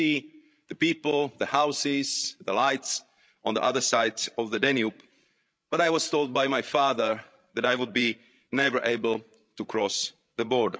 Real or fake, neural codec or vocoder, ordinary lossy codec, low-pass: fake; codec, 16 kHz, 16 kbps, FreqCodec, larger model; none; none